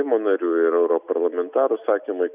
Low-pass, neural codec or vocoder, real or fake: 3.6 kHz; none; real